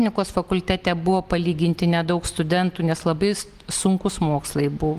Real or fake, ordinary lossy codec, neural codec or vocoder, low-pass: real; Opus, 24 kbps; none; 14.4 kHz